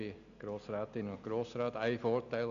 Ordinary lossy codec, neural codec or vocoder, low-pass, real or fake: none; none; 7.2 kHz; real